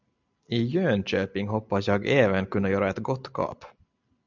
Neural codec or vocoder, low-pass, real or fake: none; 7.2 kHz; real